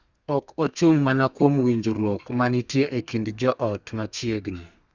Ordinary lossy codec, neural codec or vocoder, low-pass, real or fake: Opus, 64 kbps; codec, 32 kHz, 1.9 kbps, SNAC; 7.2 kHz; fake